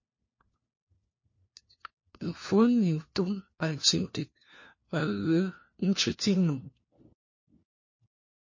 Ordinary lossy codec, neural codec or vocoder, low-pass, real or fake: MP3, 32 kbps; codec, 16 kHz, 1 kbps, FunCodec, trained on LibriTTS, 50 frames a second; 7.2 kHz; fake